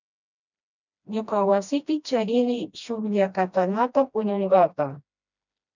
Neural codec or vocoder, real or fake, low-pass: codec, 16 kHz, 1 kbps, FreqCodec, smaller model; fake; 7.2 kHz